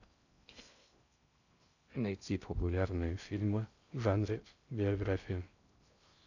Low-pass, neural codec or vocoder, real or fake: 7.2 kHz; codec, 16 kHz in and 24 kHz out, 0.6 kbps, FocalCodec, streaming, 4096 codes; fake